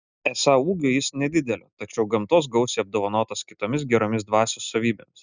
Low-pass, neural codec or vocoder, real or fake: 7.2 kHz; none; real